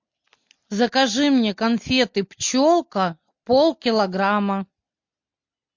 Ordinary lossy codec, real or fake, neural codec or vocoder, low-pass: MP3, 48 kbps; real; none; 7.2 kHz